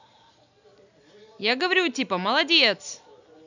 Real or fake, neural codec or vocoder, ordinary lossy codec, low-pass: real; none; none; 7.2 kHz